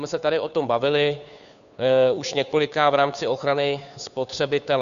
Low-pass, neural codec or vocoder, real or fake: 7.2 kHz; codec, 16 kHz, 2 kbps, FunCodec, trained on Chinese and English, 25 frames a second; fake